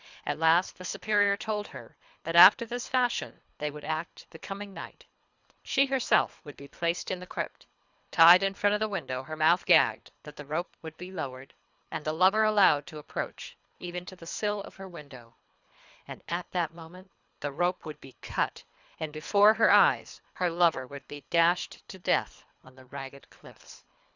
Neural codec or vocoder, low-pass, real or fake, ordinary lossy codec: codec, 24 kHz, 3 kbps, HILCodec; 7.2 kHz; fake; Opus, 64 kbps